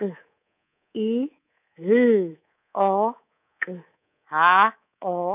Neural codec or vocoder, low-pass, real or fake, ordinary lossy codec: codec, 24 kHz, 3.1 kbps, DualCodec; 3.6 kHz; fake; none